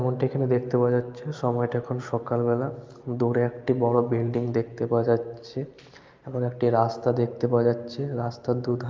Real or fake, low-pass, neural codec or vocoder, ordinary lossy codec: real; 7.2 kHz; none; Opus, 32 kbps